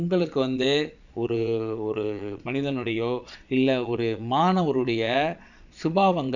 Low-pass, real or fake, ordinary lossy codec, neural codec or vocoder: 7.2 kHz; fake; none; vocoder, 22.05 kHz, 80 mel bands, Vocos